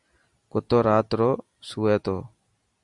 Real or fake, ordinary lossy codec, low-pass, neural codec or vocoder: real; Opus, 64 kbps; 10.8 kHz; none